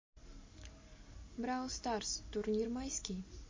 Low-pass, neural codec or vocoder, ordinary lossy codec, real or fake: 7.2 kHz; none; MP3, 32 kbps; real